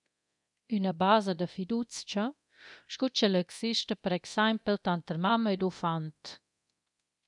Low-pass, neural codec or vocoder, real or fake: 10.8 kHz; codec, 24 kHz, 0.9 kbps, DualCodec; fake